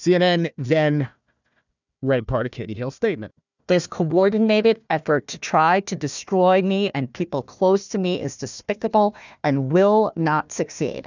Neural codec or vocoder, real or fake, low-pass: codec, 16 kHz, 1 kbps, FunCodec, trained on Chinese and English, 50 frames a second; fake; 7.2 kHz